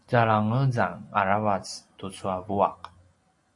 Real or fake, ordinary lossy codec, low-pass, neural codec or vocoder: real; MP3, 48 kbps; 10.8 kHz; none